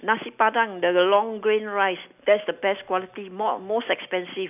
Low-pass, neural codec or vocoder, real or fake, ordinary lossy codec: 3.6 kHz; none; real; none